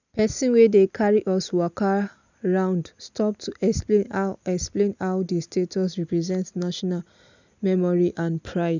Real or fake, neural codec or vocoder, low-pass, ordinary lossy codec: real; none; 7.2 kHz; none